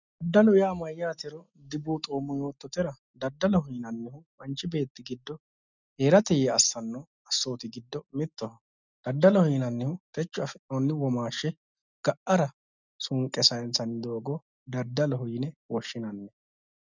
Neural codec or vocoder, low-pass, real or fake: none; 7.2 kHz; real